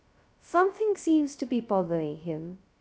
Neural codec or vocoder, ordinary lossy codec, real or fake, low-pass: codec, 16 kHz, 0.2 kbps, FocalCodec; none; fake; none